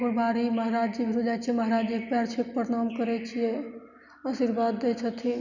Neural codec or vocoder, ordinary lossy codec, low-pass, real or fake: none; none; 7.2 kHz; real